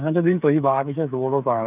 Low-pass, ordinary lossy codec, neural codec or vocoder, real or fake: 3.6 kHz; none; codec, 16 kHz, 8 kbps, FreqCodec, smaller model; fake